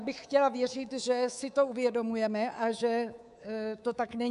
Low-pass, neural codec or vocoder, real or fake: 10.8 kHz; codec, 24 kHz, 3.1 kbps, DualCodec; fake